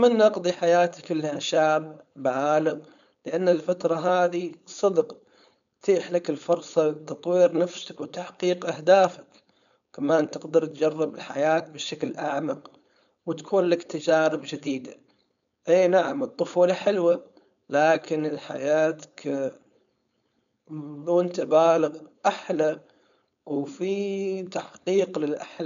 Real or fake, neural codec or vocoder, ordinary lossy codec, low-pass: fake; codec, 16 kHz, 4.8 kbps, FACodec; none; 7.2 kHz